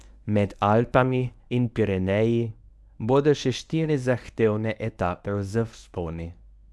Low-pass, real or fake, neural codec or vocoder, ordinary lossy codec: none; fake; codec, 24 kHz, 0.9 kbps, WavTokenizer, medium speech release version 1; none